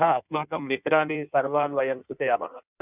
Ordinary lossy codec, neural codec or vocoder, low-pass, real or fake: none; codec, 16 kHz in and 24 kHz out, 1.1 kbps, FireRedTTS-2 codec; 3.6 kHz; fake